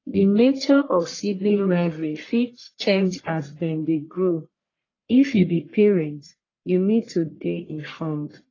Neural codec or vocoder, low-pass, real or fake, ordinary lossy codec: codec, 44.1 kHz, 1.7 kbps, Pupu-Codec; 7.2 kHz; fake; AAC, 32 kbps